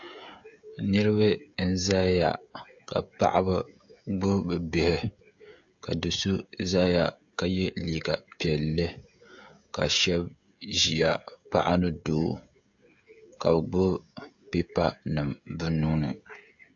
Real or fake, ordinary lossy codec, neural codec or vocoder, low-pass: fake; AAC, 64 kbps; codec, 16 kHz, 16 kbps, FreqCodec, smaller model; 7.2 kHz